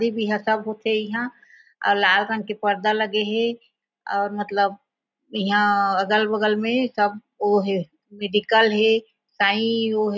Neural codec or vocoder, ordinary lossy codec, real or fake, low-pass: none; none; real; 7.2 kHz